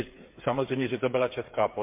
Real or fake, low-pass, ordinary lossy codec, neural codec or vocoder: fake; 3.6 kHz; MP3, 32 kbps; codec, 16 kHz, 8 kbps, FreqCodec, smaller model